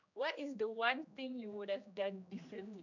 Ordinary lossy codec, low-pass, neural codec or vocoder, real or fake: none; 7.2 kHz; codec, 16 kHz, 1 kbps, X-Codec, HuBERT features, trained on general audio; fake